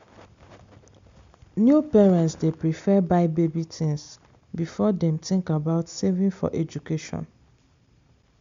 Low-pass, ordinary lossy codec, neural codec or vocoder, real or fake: 7.2 kHz; MP3, 64 kbps; none; real